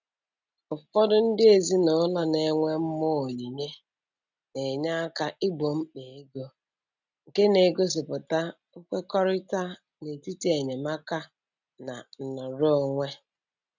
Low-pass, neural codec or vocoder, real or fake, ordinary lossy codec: 7.2 kHz; none; real; none